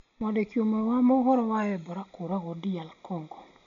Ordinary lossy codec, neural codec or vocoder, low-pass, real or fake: MP3, 96 kbps; none; 7.2 kHz; real